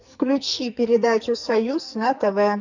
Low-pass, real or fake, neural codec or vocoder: 7.2 kHz; fake; codec, 44.1 kHz, 2.6 kbps, SNAC